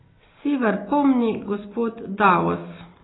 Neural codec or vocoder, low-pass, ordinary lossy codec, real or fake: none; 7.2 kHz; AAC, 16 kbps; real